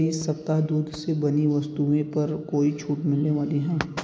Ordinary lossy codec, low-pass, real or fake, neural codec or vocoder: none; none; real; none